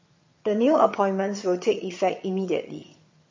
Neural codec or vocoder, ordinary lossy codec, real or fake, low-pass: vocoder, 22.05 kHz, 80 mel bands, HiFi-GAN; MP3, 32 kbps; fake; 7.2 kHz